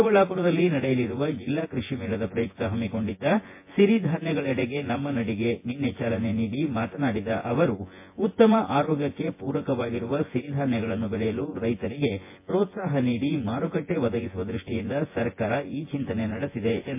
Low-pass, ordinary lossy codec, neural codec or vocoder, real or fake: 3.6 kHz; MP3, 24 kbps; vocoder, 24 kHz, 100 mel bands, Vocos; fake